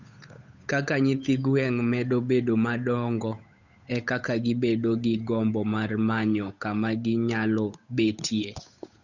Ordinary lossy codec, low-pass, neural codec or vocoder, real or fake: none; 7.2 kHz; codec, 16 kHz, 8 kbps, FunCodec, trained on Chinese and English, 25 frames a second; fake